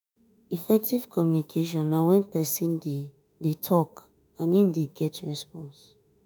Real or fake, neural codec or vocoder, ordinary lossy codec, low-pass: fake; autoencoder, 48 kHz, 32 numbers a frame, DAC-VAE, trained on Japanese speech; none; none